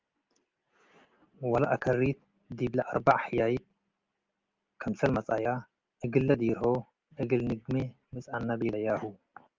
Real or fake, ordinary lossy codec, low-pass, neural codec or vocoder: real; Opus, 32 kbps; 7.2 kHz; none